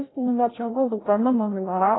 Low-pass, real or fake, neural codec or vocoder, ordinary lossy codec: 7.2 kHz; fake; codec, 16 kHz, 0.5 kbps, FreqCodec, larger model; AAC, 16 kbps